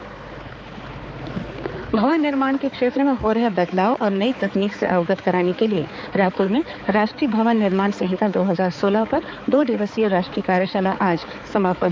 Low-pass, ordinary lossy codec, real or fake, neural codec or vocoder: none; none; fake; codec, 16 kHz, 4 kbps, X-Codec, HuBERT features, trained on balanced general audio